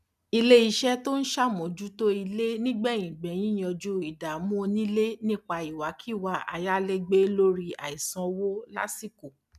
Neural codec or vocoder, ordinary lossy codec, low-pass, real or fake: none; none; 14.4 kHz; real